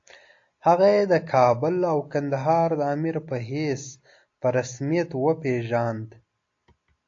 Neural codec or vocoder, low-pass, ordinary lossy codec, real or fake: none; 7.2 kHz; AAC, 48 kbps; real